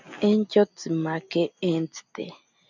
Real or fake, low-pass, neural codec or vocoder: real; 7.2 kHz; none